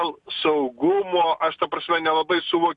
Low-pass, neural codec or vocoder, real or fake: 10.8 kHz; none; real